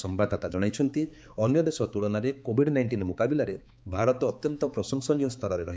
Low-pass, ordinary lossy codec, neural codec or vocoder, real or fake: none; none; codec, 16 kHz, 4 kbps, X-Codec, HuBERT features, trained on balanced general audio; fake